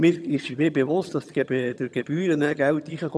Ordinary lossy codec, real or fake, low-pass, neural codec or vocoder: none; fake; none; vocoder, 22.05 kHz, 80 mel bands, HiFi-GAN